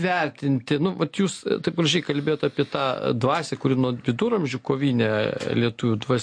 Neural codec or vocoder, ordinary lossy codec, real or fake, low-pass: none; MP3, 48 kbps; real; 9.9 kHz